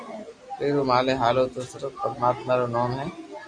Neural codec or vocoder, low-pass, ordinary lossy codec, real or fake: none; 9.9 kHz; Opus, 64 kbps; real